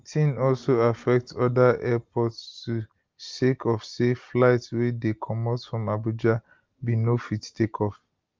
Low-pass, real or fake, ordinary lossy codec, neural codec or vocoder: 7.2 kHz; real; Opus, 32 kbps; none